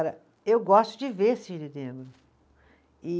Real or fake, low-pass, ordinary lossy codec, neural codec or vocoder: real; none; none; none